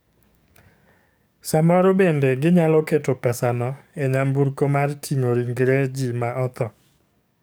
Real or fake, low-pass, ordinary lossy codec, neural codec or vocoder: fake; none; none; codec, 44.1 kHz, 7.8 kbps, DAC